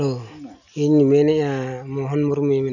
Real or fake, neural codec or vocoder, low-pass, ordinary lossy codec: real; none; 7.2 kHz; none